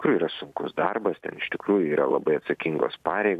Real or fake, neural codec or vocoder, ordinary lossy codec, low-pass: real; none; MP3, 96 kbps; 14.4 kHz